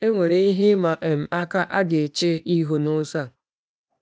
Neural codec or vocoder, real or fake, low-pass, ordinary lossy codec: codec, 16 kHz, 0.8 kbps, ZipCodec; fake; none; none